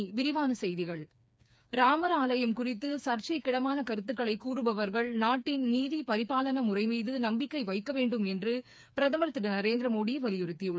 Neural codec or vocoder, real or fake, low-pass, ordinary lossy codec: codec, 16 kHz, 4 kbps, FreqCodec, smaller model; fake; none; none